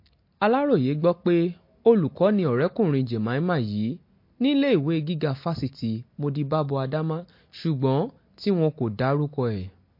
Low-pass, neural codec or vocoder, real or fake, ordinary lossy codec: 5.4 kHz; none; real; MP3, 32 kbps